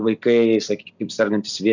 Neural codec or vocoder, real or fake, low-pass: none; real; 7.2 kHz